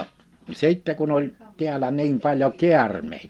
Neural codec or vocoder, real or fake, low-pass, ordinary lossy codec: none; real; 19.8 kHz; Opus, 24 kbps